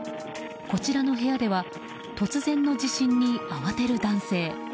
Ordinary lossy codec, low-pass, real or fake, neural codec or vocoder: none; none; real; none